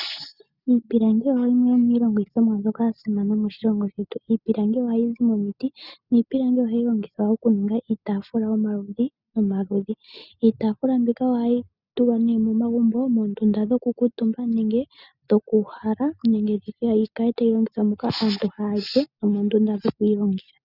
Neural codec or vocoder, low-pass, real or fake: none; 5.4 kHz; real